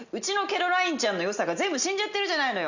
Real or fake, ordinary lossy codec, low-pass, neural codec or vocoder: real; none; 7.2 kHz; none